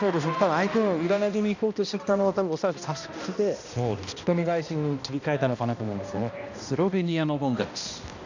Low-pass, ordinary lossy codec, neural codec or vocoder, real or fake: 7.2 kHz; none; codec, 16 kHz, 1 kbps, X-Codec, HuBERT features, trained on balanced general audio; fake